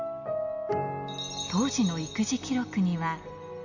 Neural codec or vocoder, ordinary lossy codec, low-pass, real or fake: none; none; 7.2 kHz; real